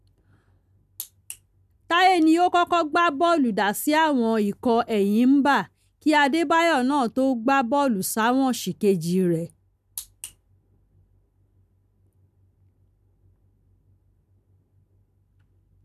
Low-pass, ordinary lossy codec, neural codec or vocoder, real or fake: 14.4 kHz; none; none; real